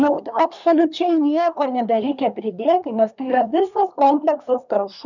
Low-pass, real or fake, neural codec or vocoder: 7.2 kHz; fake; codec, 24 kHz, 1 kbps, SNAC